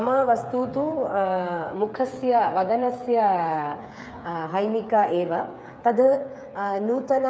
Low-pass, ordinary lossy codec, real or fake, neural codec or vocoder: none; none; fake; codec, 16 kHz, 8 kbps, FreqCodec, smaller model